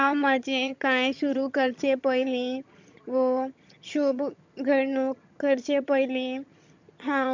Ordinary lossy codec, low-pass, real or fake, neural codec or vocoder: MP3, 64 kbps; 7.2 kHz; fake; vocoder, 22.05 kHz, 80 mel bands, HiFi-GAN